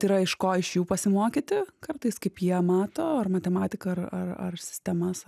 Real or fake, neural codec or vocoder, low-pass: real; none; 14.4 kHz